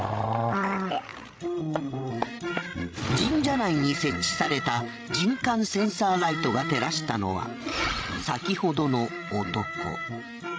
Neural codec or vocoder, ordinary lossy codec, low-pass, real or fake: codec, 16 kHz, 16 kbps, FreqCodec, larger model; none; none; fake